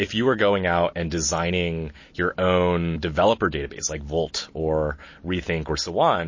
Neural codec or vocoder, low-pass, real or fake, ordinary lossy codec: none; 7.2 kHz; real; MP3, 32 kbps